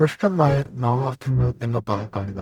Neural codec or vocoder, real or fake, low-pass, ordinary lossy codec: codec, 44.1 kHz, 0.9 kbps, DAC; fake; 19.8 kHz; none